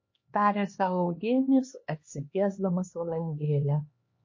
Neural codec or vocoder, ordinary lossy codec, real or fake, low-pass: codec, 16 kHz, 2 kbps, X-Codec, HuBERT features, trained on LibriSpeech; MP3, 32 kbps; fake; 7.2 kHz